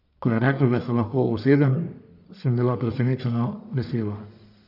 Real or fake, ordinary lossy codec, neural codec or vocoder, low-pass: fake; none; codec, 44.1 kHz, 1.7 kbps, Pupu-Codec; 5.4 kHz